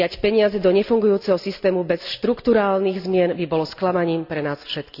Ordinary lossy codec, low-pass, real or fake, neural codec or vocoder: none; 5.4 kHz; real; none